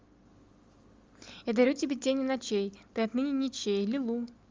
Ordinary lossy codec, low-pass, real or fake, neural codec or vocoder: Opus, 32 kbps; 7.2 kHz; real; none